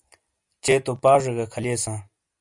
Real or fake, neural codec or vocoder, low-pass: real; none; 10.8 kHz